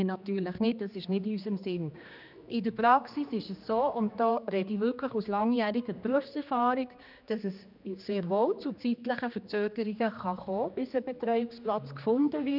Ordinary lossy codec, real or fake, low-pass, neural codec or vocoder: none; fake; 5.4 kHz; codec, 16 kHz, 2 kbps, X-Codec, HuBERT features, trained on general audio